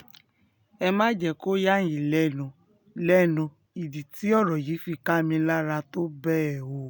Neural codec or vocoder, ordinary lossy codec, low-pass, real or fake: none; none; 19.8 kHz; real